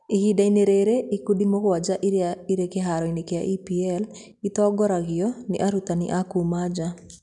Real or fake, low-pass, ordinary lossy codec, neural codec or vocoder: real; 10.8 kHz; none; none